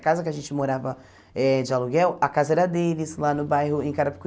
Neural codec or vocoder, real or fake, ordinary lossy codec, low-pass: none; real; none; none